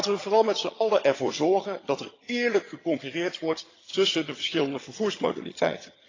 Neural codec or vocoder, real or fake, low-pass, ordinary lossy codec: vocoder, 22.05 kHz, 80 mel bands, HiFi-GAN; fake; 7.2 kHz; AAC, 32 kbps